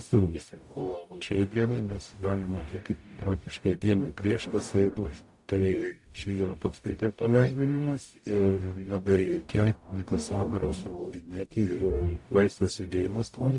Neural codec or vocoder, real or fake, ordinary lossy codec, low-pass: codec, 44.1 kHz, 0.9 kbps, DAC; fake; AAC, 64 kbps; 10.8 kHz